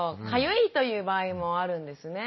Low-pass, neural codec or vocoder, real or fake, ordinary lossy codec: 7.2 kHz; none; real; MP3, 24 kbps